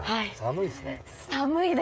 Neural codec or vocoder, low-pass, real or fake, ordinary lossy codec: codec, 16 kHz, 16 kbps, FreqCodec, smaller model; none; fake; none